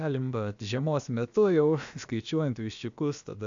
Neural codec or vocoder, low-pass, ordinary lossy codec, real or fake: codec, 16 kHz, about 1 kbps, DyCAST, with the encoder's durations; 7.2 kHz; AAC, 64 kbps; fake